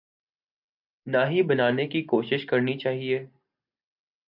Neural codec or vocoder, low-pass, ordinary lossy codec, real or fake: none; 5.4 kHz; MP3, 48 kbps; real